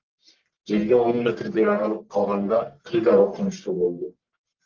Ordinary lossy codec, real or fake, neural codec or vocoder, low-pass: Opus, 32 kbps; fake; codec, 44.1 kHz, 1.7 kbps, Pupu-Codec; 7.2 kHz